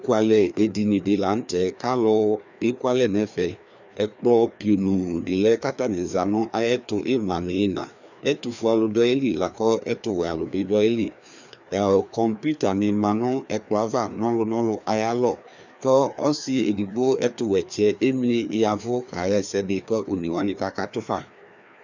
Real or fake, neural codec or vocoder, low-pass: fake; codec, 16 kHz, 2 kbps, FreqCodec, larger model; 7.2 kHz